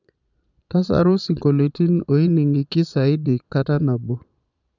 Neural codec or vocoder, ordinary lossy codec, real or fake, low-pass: vocoder, 22.05 kHz, 80 mel bands, Vocos; none; fake; 7.2 kHz